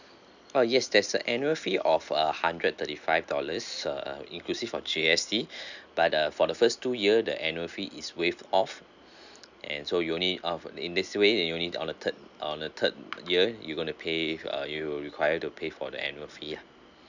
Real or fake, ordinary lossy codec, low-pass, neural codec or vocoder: real; none; 7.2 kHz; none